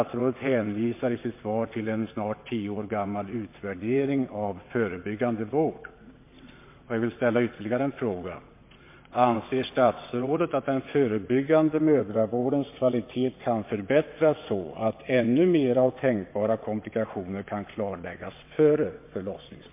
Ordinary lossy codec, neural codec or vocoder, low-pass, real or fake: MP3, 24 kbps; vocoder, 22.05 kHz, 80 mel bands, WaveNeXt; 3.6 kHz; fake